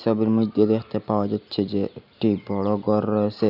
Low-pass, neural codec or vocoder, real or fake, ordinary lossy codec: 5.4 kHz; vocoder, 44.1 kHz, 128 mel bands every 512 samples, BigVGAN v2; fake; none